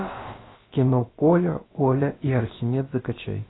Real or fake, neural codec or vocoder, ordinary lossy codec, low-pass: fake; codec, 16 kHz, about 1 kbps, DyCAST, with the encoder's durations; AAC, 16 kbps; 7.2 kHz